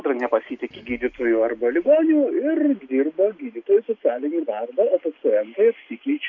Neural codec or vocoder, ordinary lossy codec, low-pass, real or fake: none; MP3, 64 kbps; 7.2 kHz; real